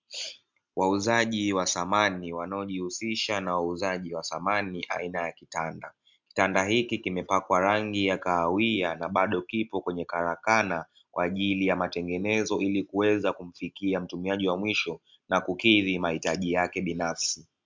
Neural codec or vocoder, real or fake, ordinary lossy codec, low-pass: none; real; MP3, 64 kbps; 7.2 kHz